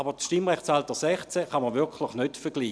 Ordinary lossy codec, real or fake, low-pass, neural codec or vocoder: none; real; none; none